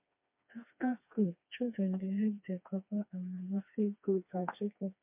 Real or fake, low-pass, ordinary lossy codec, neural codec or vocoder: fake; 3.6 kHz; MP3, 32 kbps; codec, 16 kHz, 2 kbps, FreqCodec, smaller model